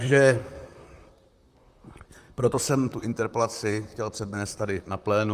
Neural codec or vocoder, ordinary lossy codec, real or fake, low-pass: vocoder, 44.1 kHz, 128 mel bands, Pupu-Vocoder; Opus, 32 kbps; fake; 14.4 kHz